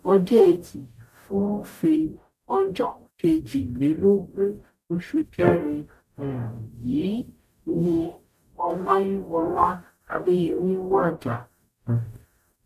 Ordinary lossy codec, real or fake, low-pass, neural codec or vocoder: none; fake; 14.4 kHz; codec, 44.1 kHz, 0.9 kbps, DAC